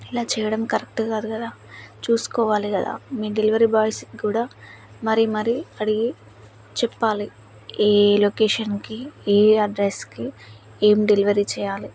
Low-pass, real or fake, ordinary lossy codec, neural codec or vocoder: none; real; none; none